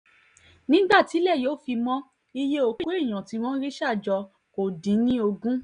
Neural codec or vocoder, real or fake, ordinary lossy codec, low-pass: none; real; none; 9.9 kHz